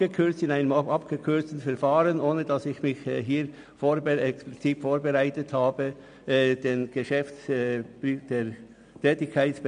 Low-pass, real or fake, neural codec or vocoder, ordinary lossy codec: 9.9 kHz; real; none; MP3, 96 kbps